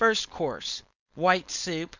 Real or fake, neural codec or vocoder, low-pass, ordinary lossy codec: fake; codec, 16 kHz, 4.8 kbps, FACodec; 7.2 kHz; Opus, 64 kbps